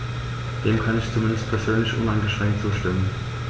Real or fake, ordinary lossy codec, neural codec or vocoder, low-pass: real; none; none; none